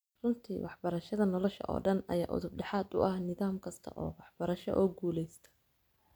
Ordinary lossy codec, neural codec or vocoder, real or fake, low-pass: none; none; real; none